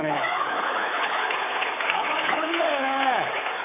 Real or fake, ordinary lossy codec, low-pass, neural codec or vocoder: fake; AAC, 16 kbps; 3.6 kHz; codec, 24 kHz, 3.1 kbps, DualCodec